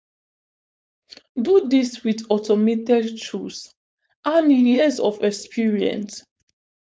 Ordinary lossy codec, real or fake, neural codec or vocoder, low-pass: none; fake; codec, 16 kHz, 4.8 kbps, FACodec; none